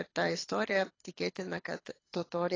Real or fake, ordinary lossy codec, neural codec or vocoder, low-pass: fake; AAC, 32 kbps; codec, 24 kHz, 6 kbps, HILCodec; 7.2 kHz